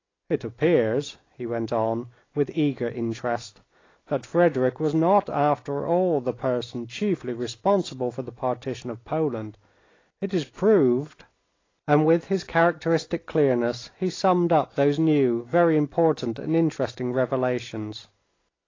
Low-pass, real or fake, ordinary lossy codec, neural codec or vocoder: 7.2 kHz; real; AAC, 32 kbps; none